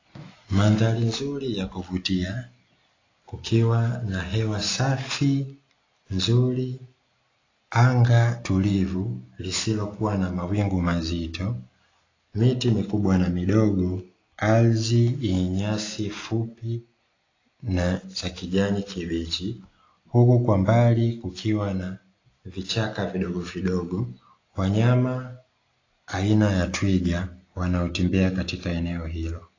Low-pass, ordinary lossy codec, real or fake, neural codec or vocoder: 7.2 kHz; AAC, 32 kbps; real; none